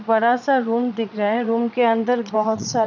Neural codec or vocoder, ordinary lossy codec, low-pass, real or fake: vocoder, 22.05 kHz, 80 mel bands, Vocos; none; 7.2 kHz; fake